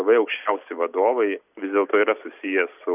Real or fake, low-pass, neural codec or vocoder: real; 3.6 kHz; none